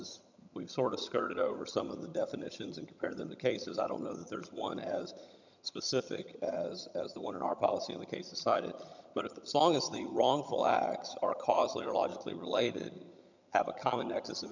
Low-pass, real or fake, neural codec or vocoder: 7.2 kHz; fake; vocoder, 22.05 kHz, 80 mel bands, HiFi-GAN